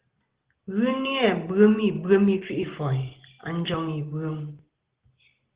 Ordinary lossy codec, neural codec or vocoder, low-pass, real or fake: Opus, 16 kbps; none; 3.6 kHz; real